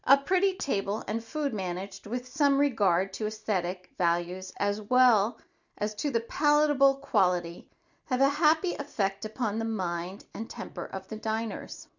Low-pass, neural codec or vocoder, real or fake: 7.2 kHz; none; real